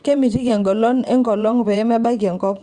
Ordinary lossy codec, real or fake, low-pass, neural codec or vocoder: Opus, 64 kbps; fake; 9.9 kHz; vocoder, 22.05 kHz, 80 mel bands, WaveNeXt